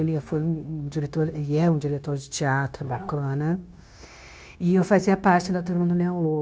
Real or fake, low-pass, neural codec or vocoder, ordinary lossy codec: fake; none; codec, 16 kHz, 0.9 kbps, LongCat-Audio-Codec; none